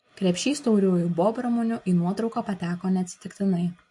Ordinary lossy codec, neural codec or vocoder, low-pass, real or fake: MP3, 48 kbps; none; 10.8 kHz; real